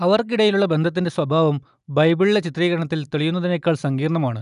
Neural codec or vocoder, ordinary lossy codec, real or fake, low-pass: none; none; real; 10.8 kHz